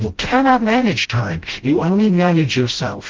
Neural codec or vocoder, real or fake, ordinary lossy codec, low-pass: codec, 16 kHz, 0.5 kbps, FreqCodec, smaller model; fake; Opus, 16 kbps; 7.2 kHz